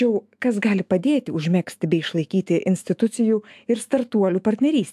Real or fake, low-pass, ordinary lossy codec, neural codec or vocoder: fake; 14.4 kHz; AAC, 96 kbps; autoencoder, 48 kHz, 128 numbers a frame, DAC-VAE, trained on Japanese speech